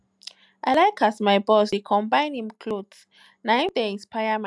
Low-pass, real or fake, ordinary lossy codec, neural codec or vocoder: none; real; none; none